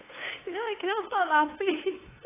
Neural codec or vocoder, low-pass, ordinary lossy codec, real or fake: codec, 16 kHz, 2 kbps, FunCodec, trained on LibriTTS, 25 frames a second; 3.6 kHz; AAC, 16 kbps; fake